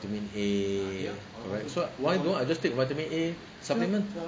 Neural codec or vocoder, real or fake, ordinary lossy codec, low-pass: none; real; none; none